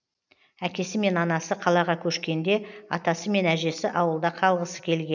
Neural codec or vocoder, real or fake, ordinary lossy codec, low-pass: none; real; none; 7.2 kHz